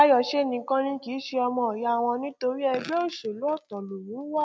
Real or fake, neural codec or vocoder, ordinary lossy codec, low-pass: real; none; none; none